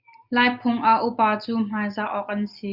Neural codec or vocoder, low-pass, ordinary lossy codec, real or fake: none; 5.4 kHz; Opus, 64 kbps; real